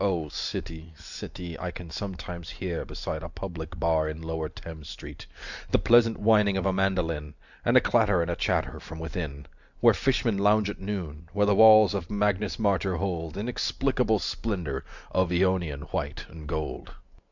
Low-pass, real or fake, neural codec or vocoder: 7.2 kHz; real; none